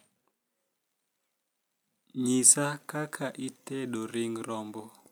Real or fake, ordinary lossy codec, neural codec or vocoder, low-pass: real; none; none; none